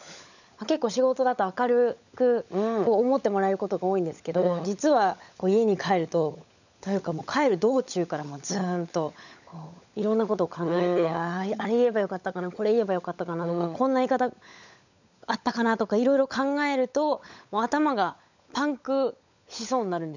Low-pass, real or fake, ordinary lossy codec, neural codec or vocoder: 7.2 kHz; fake; none; codec, 16 kHz, 16 kbps, FunCodec, trained on LibriTTS, 50 frames a second